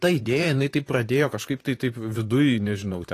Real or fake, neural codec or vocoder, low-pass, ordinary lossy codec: fake; vocoder, 44.1 kHz, 128 mel bands, Pupu-Vocoder; 14.4 kHz; AAC, 48 kbps